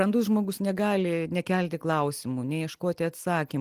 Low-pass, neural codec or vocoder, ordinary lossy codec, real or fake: 14.4 kHz; none; Opus, 24 kbps; real